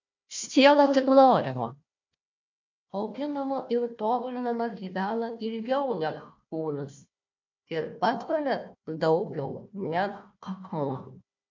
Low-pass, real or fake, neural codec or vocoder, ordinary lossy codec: 7.2 kHz; fake; codec, 16 kHz, 1 kbps, FunCodec, trained on Chinese and English, 50 frames a second; MP3, 48 kbps